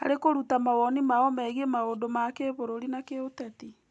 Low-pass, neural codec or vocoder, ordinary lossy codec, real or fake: none; none; none; real